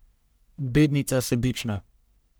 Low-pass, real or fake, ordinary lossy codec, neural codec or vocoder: none; fake; none; codec, 44.1 kHz, 1.7 kbps, Pupu-Codec